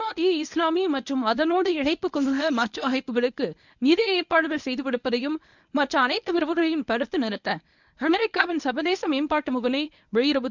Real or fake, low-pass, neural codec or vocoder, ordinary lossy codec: fake; 7.2 kHz; codec, 24 kHz, 0.9 kbps, WavTokenizer, medium speech release version 1; none